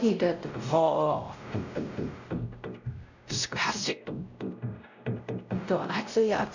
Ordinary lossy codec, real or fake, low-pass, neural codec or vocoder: none; fake; 7.2 kHz; codec, 16 kHz, 0.5 kbps, X-Codec, WavLM features, trained on Multilingual LibriSpeech